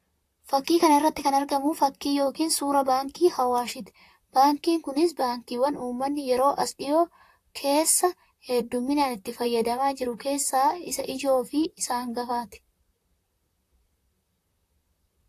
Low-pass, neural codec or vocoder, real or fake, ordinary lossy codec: 14.4 kHz; vocoder, 44.1 kHz, 128 mel bands, Pupu-Vocoder; fake; AAC, 64 kbps